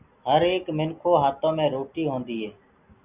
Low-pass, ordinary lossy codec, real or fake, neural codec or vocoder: 3.6 kHz; Opus, 64 kbps; real; none